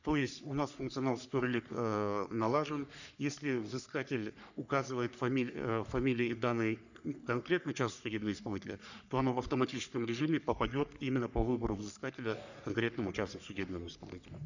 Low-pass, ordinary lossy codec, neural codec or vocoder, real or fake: 7.2 kHz; none; codec, 44.1 kHz, 3.4 kbps, Pupu-Codec; fake